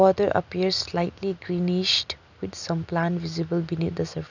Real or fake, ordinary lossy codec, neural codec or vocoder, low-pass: real; none; none; 7.2 kHz